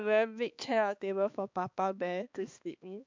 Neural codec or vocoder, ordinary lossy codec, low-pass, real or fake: codec, 16 kHz, 2 kbps, X-Codec, HuBERT features, trained on balanced general audio; MP3, 64 kbps; 7.2 kHz; fake